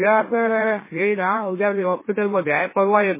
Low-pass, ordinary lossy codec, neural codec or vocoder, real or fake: 3.6 kHz; MP3, 16 kbps; autoencoder, 44.1 kHz, a latent of 192 numbers a frame, MeloTTS; fake